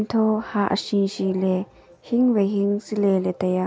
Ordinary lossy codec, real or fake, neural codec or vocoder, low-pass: none; real; none; none